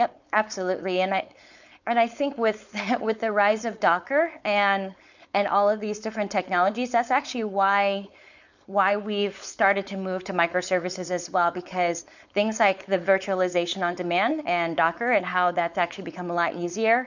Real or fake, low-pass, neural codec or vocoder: fake; 7.2 kHz; codec, 16 kHz, 4.8 kbps, FACodec